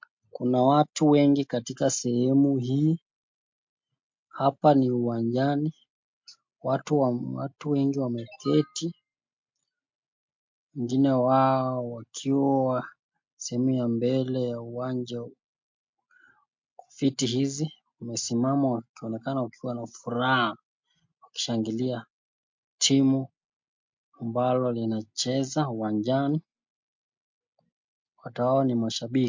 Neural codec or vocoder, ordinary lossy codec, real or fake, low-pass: none; MP3, 48 kbps; real; 7.2 kHz